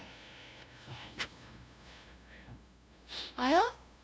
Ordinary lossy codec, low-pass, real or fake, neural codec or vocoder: none; none; fake; codec, 16 kHz, 0.5 kbps, FunCodec, trained on LibriTTS, 25 frames a second